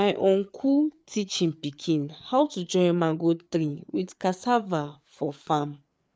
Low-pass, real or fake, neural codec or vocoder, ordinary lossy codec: none; fake; codec, 16 kHz, 4 kbps, FreqCodec, larger model; none